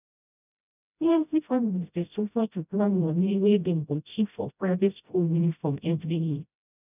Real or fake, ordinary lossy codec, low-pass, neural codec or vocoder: fake; none; 3.6 kHz; codec, 16 kHz, 0.5 kbps, FreqCodec, smaller model